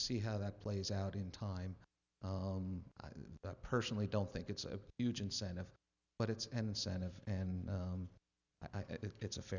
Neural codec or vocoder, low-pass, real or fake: none; 7.2 kHz; real